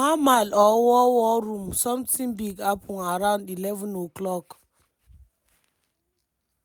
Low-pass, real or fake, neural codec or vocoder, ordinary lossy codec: none; real; none; none